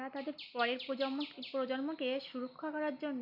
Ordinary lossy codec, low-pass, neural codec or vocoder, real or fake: none; 5.4 kHz; none; real